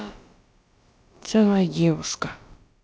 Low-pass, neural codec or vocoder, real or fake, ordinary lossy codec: none; codec, 16 kHz, about 1 kbps, DyCAST, with the encoder's durations; fake; none